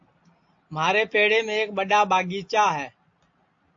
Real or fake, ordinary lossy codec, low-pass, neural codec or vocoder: real; AAC, 48 kbps; 7.2 kHz; none